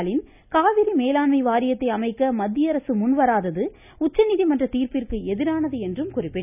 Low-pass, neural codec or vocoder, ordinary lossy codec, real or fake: 3.6 kHz; none; none; real